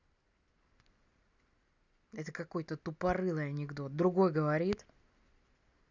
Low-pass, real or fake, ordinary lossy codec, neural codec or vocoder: 7.2 kHz; real; none; none